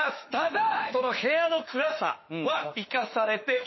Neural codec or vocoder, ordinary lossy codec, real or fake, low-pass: autoencoder, 48 kHz, 32 numbers a frame, DAC-VAE, trained on Japanese speech; MP3, 24 kbps; fake; 7.2 kHz